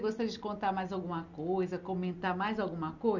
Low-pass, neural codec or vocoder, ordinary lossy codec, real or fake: 7.2 kHz; none; none; real